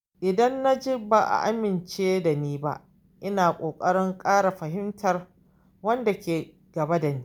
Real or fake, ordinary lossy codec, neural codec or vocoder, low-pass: real; none; none; none